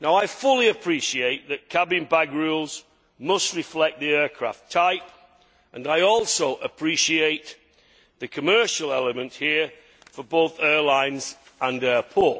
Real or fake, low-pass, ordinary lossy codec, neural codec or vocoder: real; none; none; none